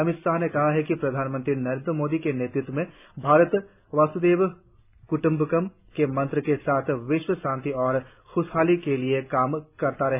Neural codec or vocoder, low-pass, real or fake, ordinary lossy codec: none; 3.6 kHz; real; none